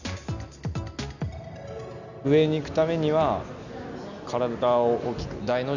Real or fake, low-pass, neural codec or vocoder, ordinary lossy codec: real; 7.2 kHz; none; none